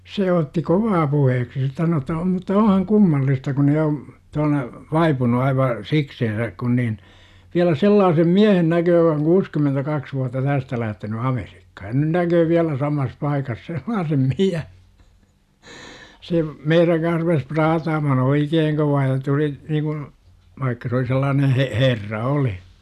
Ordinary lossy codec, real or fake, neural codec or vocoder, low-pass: none; real; none; 14.4 kHz